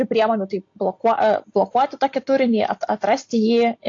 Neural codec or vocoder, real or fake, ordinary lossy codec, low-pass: none; real; AAC, 48 kbps; 7.2 kHz